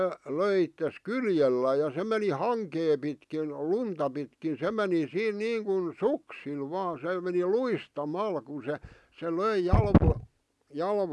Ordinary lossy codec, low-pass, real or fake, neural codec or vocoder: none; none; real; none